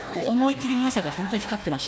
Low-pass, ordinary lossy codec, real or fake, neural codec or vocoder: none; none; fake; codec, 16 kHz, 1 kbps, FunCodec, trained on Chinese and English, 50 frames a second